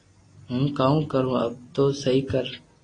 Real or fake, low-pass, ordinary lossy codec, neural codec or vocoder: real; 9.9 kHz; AAC, 32 kbps; none